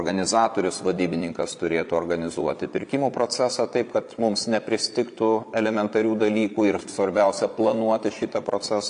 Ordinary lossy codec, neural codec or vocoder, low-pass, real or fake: AAC, 64 kbps; vocoder, 22.05 kHz, 80 mel bands, Vocos; 9.9 kHz; fake